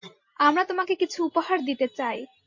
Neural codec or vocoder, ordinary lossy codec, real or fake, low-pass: none; MP3, 48 kbps; real; 7.2 kHz